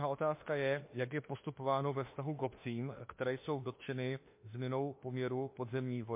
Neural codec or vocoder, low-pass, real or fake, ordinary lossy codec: autoencoder, 48 kHz, 32 numbers a frame, DAC-VAE, trained on Japanese speech; 3.6 kHz; fake; MP3, 24 kbps